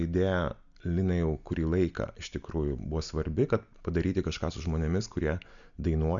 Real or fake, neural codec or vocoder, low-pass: real; none; 7.2 kHz